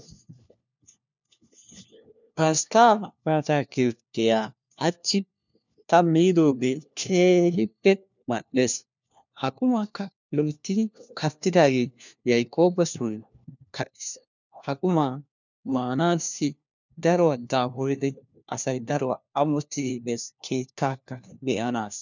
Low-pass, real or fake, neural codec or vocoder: 7.2 kHz; fake; codec, 16 kHz, 1 kbps, FunCodec, trained on LibriTTS, 50 frames a second